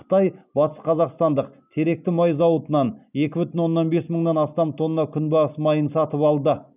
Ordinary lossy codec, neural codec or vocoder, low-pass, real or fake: none; none; 3.6 kHz; real